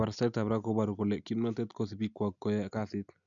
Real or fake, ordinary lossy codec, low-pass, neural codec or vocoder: real; none; 7.2 kHz; none